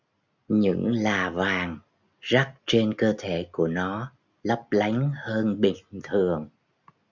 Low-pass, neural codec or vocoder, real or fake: 7.2 kHz; none; real